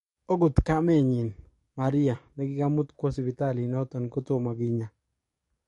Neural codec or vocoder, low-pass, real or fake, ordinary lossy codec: codec, 44.1 kHz, 7.8 kbps, DAC; 19.8 kHz; fake; MP3, 48 kbps